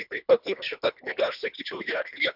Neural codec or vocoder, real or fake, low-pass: codec, 24 kHz, 1.5 kbps, HILCodec; fake; 5.4 kHz